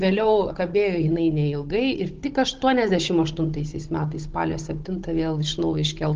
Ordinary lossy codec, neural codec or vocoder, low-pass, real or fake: Opus, 16 kbps; codec, 16 kHz, 16 kbps, FunCodec, trained on Chinese and English, 50 frames a second; 7.2 kHz; fake